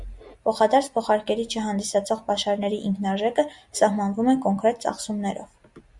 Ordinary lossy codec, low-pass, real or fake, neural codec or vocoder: Opus, 64 kbps; 10.8 kHz; real; none